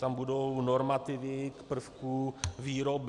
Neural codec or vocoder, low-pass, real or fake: none; 10.8 kHz; real